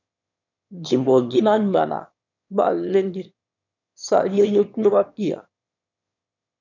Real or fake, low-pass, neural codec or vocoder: fake; 7.2 kHz; autoencoder, 22.05 kHz, a latent of 192 numbers a frame, VITS, trained on one speaker